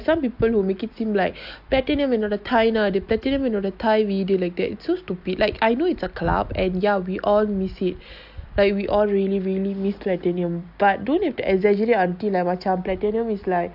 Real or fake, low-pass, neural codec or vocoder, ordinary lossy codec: real; 5.4 kHz; none; AAC, 48 kbps